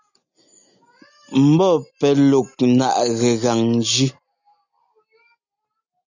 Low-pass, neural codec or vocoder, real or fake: 7.2 kHz; none; real